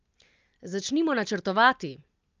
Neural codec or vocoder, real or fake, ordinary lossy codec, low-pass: none; real; Opus, 24 kbps; 7.2 kHz